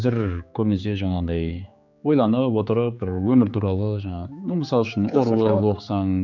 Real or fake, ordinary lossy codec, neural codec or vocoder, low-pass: fake; none; codec, 16 kHz, 4 kbps, X-Codec, HuBERT features, trained on general audio; 7.2 kHz